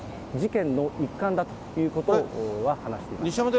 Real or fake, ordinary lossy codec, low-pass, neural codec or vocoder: real; none; none; none